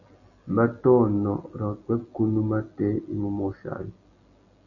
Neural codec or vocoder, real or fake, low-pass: none; real; 7.2 kHz